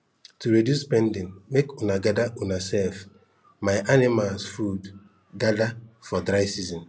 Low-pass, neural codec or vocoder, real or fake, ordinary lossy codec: none; none; real; none